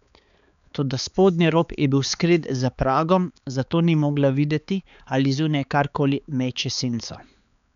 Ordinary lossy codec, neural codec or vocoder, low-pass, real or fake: none; codec, 16 kHz, 4 kbps, X-Codec, HuBERT features, trained on balanced general audio; 7.2 kHz; fake